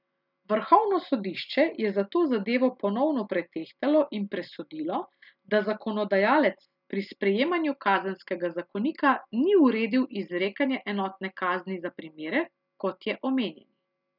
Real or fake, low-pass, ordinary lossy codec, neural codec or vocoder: real; 5.4 kHz; none; none